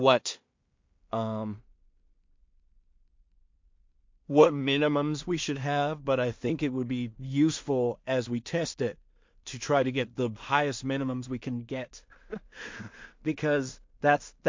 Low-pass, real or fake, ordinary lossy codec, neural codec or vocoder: 7.2 kHz; fake; MP3, 48 kbps; codec, 16 kHz in and 24 kHz out, 0.4 kbps, LongCat-Audio-Codec, two codebook decoder